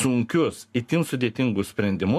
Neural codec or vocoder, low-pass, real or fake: codec, 44.1 kHz, 7.8 kbps, Pupu-Codec; 14.4 kHz; fake